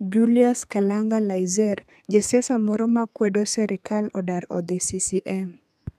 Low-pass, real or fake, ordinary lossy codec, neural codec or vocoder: 14.4 kHz; fake; none; codec, 32 kHz, 1.9 kbps, SNAC